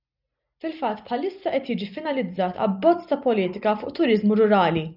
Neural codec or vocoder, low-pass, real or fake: none; 5.4 kHz; real